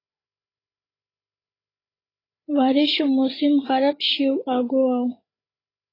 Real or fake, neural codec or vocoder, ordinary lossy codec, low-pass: fake; codec, 16 kHz, 8 kbps, FreqCodec, larger model; AAC, 24 kbps; 5.4 kHz